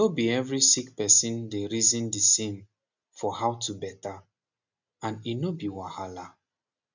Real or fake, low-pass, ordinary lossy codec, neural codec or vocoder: real; 7.2 kHz; none; none